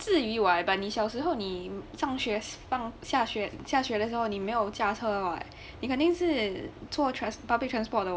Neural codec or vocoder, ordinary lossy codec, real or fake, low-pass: none; none; real; none